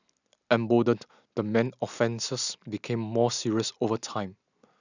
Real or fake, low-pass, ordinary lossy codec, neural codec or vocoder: real; 7.2 kHz; none; none